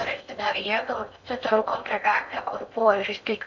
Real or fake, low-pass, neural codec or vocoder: fake; 7.2 kHz; codec, 16 kHz in and 24 kHz out, 0.6 kbps, FocalCodec, streaming, 4096 codes